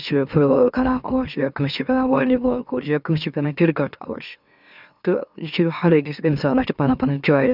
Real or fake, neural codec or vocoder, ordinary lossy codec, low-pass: fake; autoencoder, 44.1 kHz, a latent of 192 numbers a frame, MeloTTS; none; 5.4 kHz